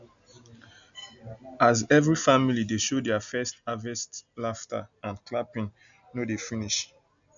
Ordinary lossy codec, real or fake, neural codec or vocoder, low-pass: none; real; none; 7.2 kHz